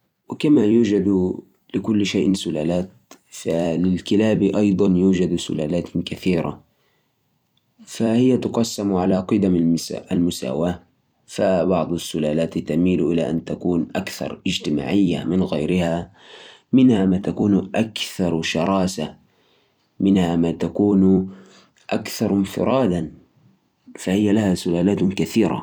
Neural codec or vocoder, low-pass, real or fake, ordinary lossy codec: vocoder, 44.1 kHz, 128 mel bands every 256 samples, BigVGAN v2; 19.8 kHz; fake; none